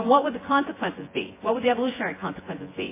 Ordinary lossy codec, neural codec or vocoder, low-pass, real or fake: MP3, 16 kbps; vocoder, 24 kHz, 100 mel bands, Vocos; 3.6 kHz; fake